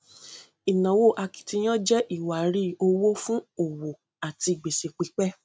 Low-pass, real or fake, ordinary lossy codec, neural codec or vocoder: none; real; none; none